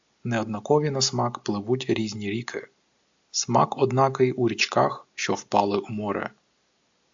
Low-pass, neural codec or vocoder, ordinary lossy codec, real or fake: 7.2 kHz; none; AAC, 64 kbps; real